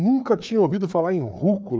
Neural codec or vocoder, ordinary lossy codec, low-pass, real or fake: codec, 16 kHz, 4 kbps, FreqCodec, larger model; none; none; fake